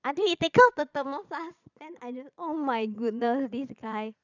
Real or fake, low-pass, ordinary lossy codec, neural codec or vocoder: fake; 7.2 kHz; none; vocoder, 22.05 kHz, 80 mel bands, Vocos